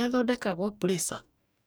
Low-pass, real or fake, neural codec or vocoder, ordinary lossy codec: none; fake; codec, 44.1 kHz, 2.6 kbps, DAC; none